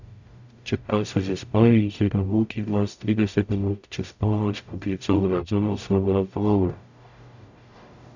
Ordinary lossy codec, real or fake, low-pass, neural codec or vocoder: none; fake; 7.2 kHz; codec, 44.1 kHz, 0.9 kbps, DAC